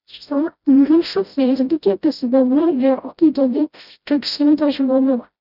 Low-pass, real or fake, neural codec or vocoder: 5.4 kHz; fake; codec, 16 kHz, 0.5 kbps, FreqCodec, smaller model